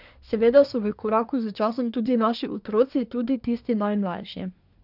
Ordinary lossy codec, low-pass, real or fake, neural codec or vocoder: none; 5.4 kHz; fake; codec, 24 kHz, 1 kbps, SNAC